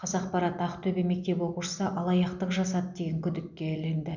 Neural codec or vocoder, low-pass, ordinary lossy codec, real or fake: none; 7.2 kHz; none; real